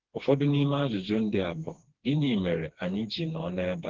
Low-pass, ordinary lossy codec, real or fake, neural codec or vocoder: 7.2 kHz; Opus, 16 kbps; fake; codec, 16 kHz, 2 kbps, FreqCodec, smaller model